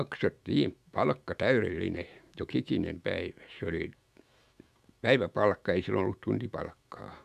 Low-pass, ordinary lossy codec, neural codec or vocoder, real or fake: 14.4 kHz; none; autoencoder, 48 kHz, 128 numbers a frame, DAC-VAE, trained on Japanese speech; fake